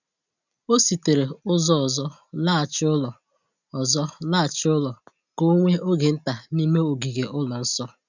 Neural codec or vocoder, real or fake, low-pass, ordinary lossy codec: none; real; 7.2 kHz; none